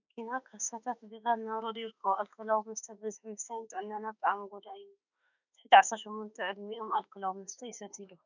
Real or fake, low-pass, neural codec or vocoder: fake; 7.2 kHz; autoencoder, 48 kHz, 32 numbers a frame, DAC-VAE, trained on Japanese speech